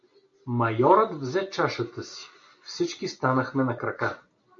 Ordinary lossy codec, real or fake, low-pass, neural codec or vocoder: AAC, 48 kbps; real; 7.2 kHz; none